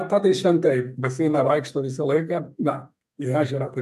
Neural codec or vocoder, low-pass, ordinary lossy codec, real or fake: codec, 32 kHz, 1.9 kbps, SNAC; 14.4 kHz; AAC, 96 kbps; fake